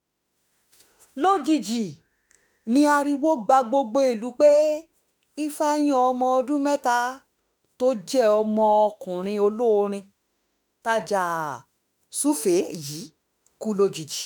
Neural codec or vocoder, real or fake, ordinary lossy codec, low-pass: autoencoder, 48 kHz, 32 numbers a frame, DAC-VAE, trained on Japanese speech; fake; none; none